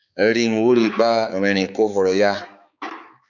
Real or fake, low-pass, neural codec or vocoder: fake; 7.2 kHz; codec, 16 kHz, 4 kbps, X-Codec, HuBERT features, trained on balanced general audio